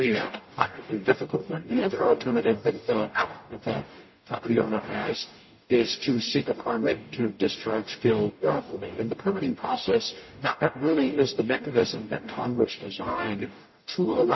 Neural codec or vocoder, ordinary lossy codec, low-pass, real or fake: codec, 44.1 kHz, 0.9 kbps, DAC; MP3, 24 kbps; 7.2 kHz; fake